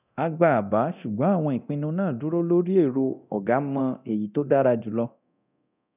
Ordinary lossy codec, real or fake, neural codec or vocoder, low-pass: none; fake; codec, 24 kHz, 0.9 kbps, DualCodec; 3.6 kHz